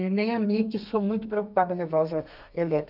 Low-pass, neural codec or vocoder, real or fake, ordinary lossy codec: 5.4 kHz; codec, 32 kHz, 1.9 kbps, SNAC; fake; none